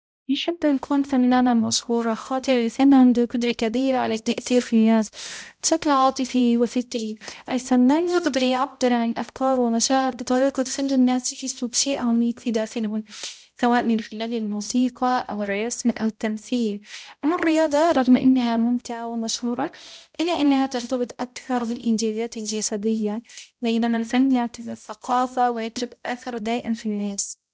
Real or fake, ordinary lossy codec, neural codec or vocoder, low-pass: fake; none; codec, 16 kHz, 0.5 kbps, X-Codec, HuBERT features, trained on balanced general audio; none